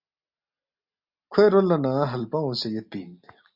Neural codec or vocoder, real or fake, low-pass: none; real; 5.4 kHz